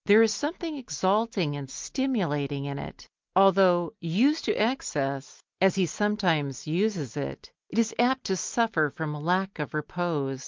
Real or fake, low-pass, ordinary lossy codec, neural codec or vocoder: real; 7.2 kHz; Opus, 32 kbps; none